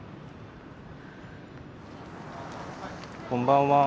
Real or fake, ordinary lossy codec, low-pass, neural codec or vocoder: real; none; none; none